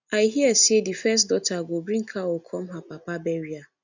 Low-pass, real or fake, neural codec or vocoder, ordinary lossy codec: 7.2 kHz; real; none; none